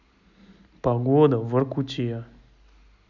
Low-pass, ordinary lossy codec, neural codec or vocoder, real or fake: 7.2 kHz; none; none; real